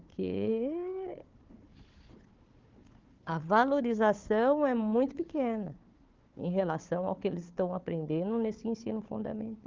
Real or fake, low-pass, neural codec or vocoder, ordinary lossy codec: fake; 7.2 kHz; codec, 16 kHz, 4 kbps, FunCodec, trained on Chinese and English, 50 frames a second; Opus, 32 kbps